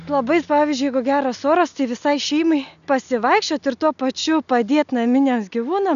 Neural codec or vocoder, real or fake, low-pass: none; real; 7.2 kHz